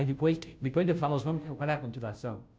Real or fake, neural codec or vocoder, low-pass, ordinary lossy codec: fake; codec, 16 kHz, 0.5 kbps, FunCodec, trained on Chinese and English, 25 frames a second; none; none